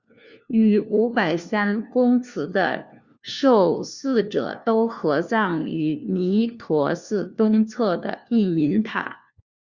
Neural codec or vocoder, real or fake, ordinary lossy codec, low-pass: codec, 16 kHz, 1 kbps, FunCodec, trained on LibriTTS, 50 frames a second; fake; Opus, 64 kbps; 7.2 kHz